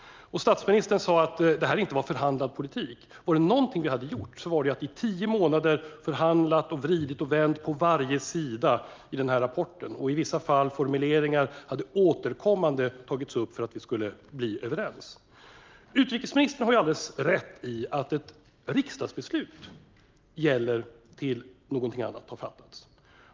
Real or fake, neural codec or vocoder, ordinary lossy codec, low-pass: real; none; Opus, 24 kbps; 7.2 kHz